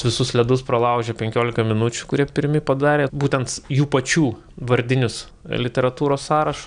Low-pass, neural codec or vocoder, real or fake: 9.9 kHz; none; real